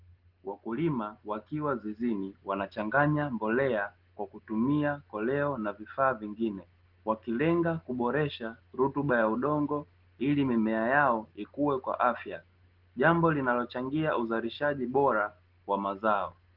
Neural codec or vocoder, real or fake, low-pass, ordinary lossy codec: none; real; 5.4 kHz; Opus, 16 kbps